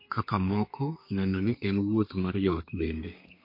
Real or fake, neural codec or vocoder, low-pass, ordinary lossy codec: fake; codec, 32 kHz, 1.9 kbps, SNAC; 5.4 kHz; MP3, 32 kbps